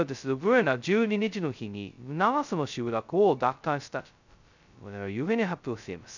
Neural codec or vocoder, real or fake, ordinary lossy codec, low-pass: codec, 16 kHz, 0.2 kbps, FocalCodec; fake; none; 7.2 kHz